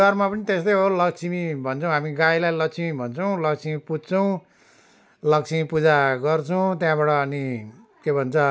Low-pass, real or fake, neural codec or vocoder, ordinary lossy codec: none; real; none; none